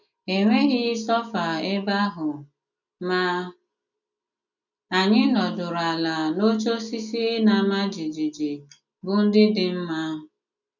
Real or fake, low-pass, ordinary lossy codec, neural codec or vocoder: real; 7.2 kHz; none; none